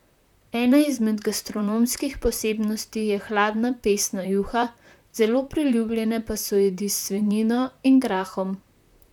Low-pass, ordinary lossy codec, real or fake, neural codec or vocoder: 19.8 kHz; none; fake; vocoder, 44.1 kHz, 128 mel bands, Pupu-Vocoder